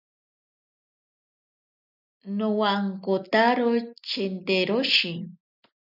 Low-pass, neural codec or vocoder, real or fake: 5.4 kHz; none; real